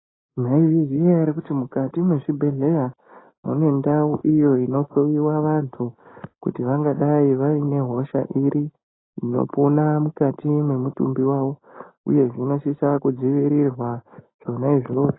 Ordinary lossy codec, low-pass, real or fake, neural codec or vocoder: AAC, 16 kbps; 7.2 kHz; real; none